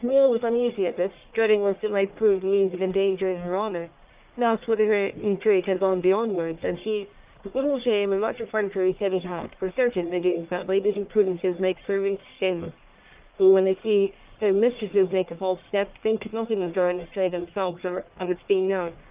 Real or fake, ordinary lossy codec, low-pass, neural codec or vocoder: fake; Opus, 24 kbps; 3.6 kHz; codec, 44.1 kHz, 1.7 kbps, Pupu-Codec